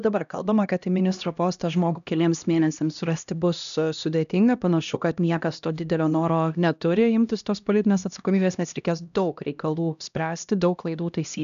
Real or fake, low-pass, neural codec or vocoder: fake; 7.2 kHz; codec, 16 kHz, 1 kbps, X-Codec, HuBERT features, trained on LibriSpeech